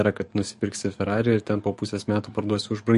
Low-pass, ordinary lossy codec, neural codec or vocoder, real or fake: 14.4 kHz; MP3, 48 kbps; codec, 44.1 kHz, 7.8 kbps, DAC; fake